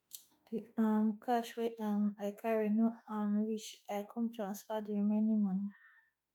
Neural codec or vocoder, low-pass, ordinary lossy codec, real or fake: autoencoder, 48 kHz, 32 numbers a frame, DAC-VAE, trained on Japanese speech; none; none; fake